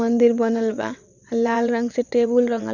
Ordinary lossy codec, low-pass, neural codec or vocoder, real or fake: none; 7.2 kHz; vocoder, 22.05 kHz, 80 mel bands, WaveNeXt; fake